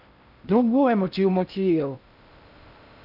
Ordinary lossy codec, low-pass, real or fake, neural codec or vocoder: none; 5.4 kHz; fake; codec, 16 kHz in and 24 kHz out, 0.6 kbps, FocalCodec, streaming, 4096 codes